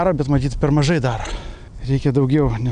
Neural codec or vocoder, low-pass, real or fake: none; 9.9 kHz; real